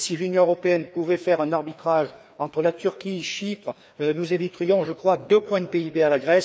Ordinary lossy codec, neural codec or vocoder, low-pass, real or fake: none; codec, 16 kHz, 2 kbps, FreqCodec, larger model; none; fake